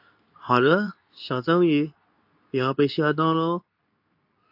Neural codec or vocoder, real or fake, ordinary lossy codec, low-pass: codec, 24 kHz, 0.9 kbps, WavTokenizer, medium speech release version 2; fake; AAC, 48 kbps; 5.4 kHz